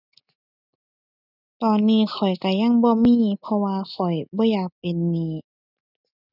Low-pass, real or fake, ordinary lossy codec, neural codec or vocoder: 5.4 kHz; real; none; none